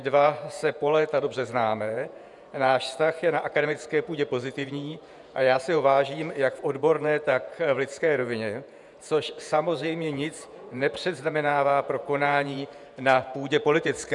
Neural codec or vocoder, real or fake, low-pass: vocoder, 48 kHz, 128 mel bands, Vocos; fake; 10.8 kHz